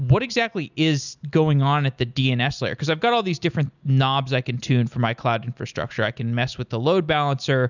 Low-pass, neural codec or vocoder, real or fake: 7.2 kHz; none; real